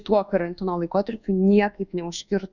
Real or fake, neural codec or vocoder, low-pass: fake; codec, 24 kHz, 1.2 kbps, DualCodec; 7.2 kHz